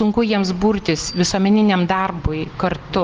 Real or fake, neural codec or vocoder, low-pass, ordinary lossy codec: real; none; 7.2 kHz; Opus, 16 kbps